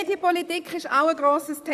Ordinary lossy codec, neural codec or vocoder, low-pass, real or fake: AAC, 96 kbps; vocoder, 44.1 kHz, 128 mel bands, Pupu-Vocoder; 14.4 kHz; fake